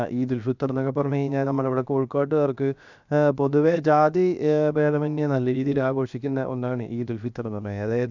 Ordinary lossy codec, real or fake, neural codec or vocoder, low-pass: none; fake; codec, 16 kHz, 0.7 kbps, FocalCodec; 7.2 kHz